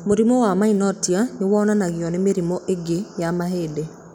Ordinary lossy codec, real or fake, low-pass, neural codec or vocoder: none; real; 19.8 kHz; none